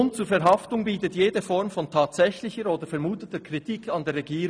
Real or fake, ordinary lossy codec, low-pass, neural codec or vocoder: real; none; 9.9 kHz; none